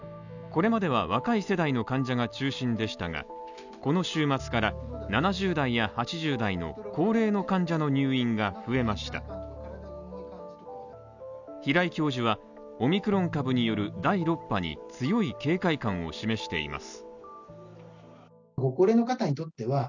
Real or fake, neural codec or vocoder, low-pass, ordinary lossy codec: real; none; 7.2 kHz; none